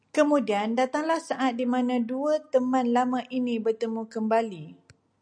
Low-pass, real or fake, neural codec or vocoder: 9.9 kHz; real; none